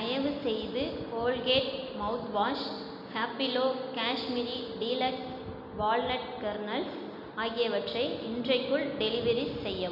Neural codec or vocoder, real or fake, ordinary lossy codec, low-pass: none; real; none; 5.4 kHz